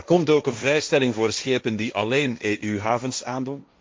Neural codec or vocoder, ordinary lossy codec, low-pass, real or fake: codec, 16 kHz, 1.1 kbps, Voila-Tokenizer; none; none; fake